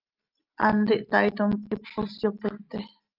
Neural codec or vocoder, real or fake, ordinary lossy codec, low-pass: none; real; Opus, 24 kbps; 5.4 kHz